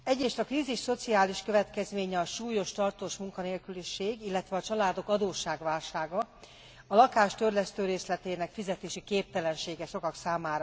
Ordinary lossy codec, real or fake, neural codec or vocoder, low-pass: none; real; none; none